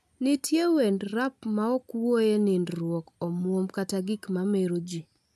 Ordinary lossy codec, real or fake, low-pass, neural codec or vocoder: AAC, 96 kbps; real; 14.4 kHz; none